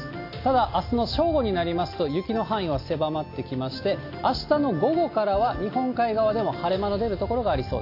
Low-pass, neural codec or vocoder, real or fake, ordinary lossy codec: 5.4 kHz; none; real; AAC, 32 kbps